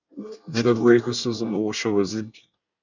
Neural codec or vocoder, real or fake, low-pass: codec, 24 kHz, 1 kbps, SNAC; fake; 7.2 kHz